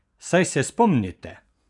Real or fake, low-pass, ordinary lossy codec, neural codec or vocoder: fake; 10.8 kHz; none; vocoder, 24 kHz, 100 mel bands, Vocos